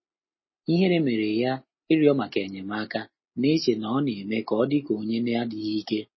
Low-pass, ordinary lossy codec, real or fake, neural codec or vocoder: 7.2 kHz; MP3, 24 kbps; real; none